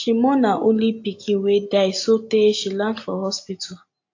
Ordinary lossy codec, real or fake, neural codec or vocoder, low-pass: AAC, 48 kbps; real; none; 7.2 kHz